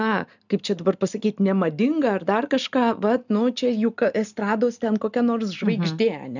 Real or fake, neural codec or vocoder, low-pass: real; none; 7.2 kHz